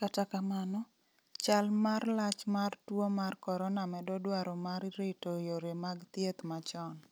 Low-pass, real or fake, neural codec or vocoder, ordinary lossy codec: none; real; none; none